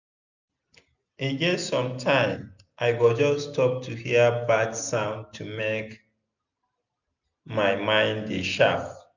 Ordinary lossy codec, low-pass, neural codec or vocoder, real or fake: none; 7.2 kHz; none; real